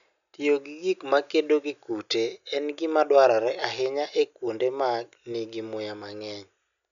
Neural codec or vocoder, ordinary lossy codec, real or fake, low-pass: none; none; real; 7.2 kHz